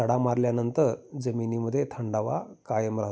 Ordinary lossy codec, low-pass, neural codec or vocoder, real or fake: none; none; none; real